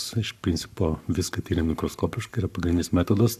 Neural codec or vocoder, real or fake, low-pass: codec, 44.1 kHz, 7.8 kbps, Pupu-Codec; fake; 14.4 kHz